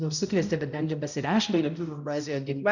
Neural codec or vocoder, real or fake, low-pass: codec, 16 kHz, 0.5 kbps, X-Codec, HuBERT features, trained on balanced general audio; fake; 7.2 kHz